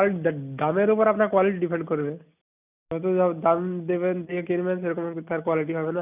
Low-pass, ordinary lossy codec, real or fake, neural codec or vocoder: 3.6 kHz; none; real; none